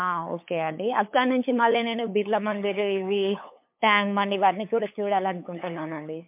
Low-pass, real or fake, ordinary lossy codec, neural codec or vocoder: 3.6 kHz; fake; MP3, 32 kbps; codec, 16 kHz, 8 kbps, FunCodec, trained on LibriTTS, 25 frames a second